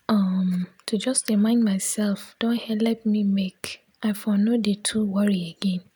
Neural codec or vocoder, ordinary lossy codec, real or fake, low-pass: none; none; real; none